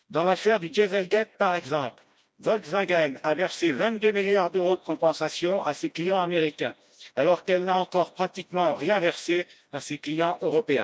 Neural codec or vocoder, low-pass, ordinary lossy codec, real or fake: codec, 16 kHz, 1 kbps, FreqCodec, smaller model; none; none; fake